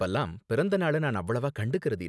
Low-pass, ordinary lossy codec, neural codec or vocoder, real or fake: none; none; none; real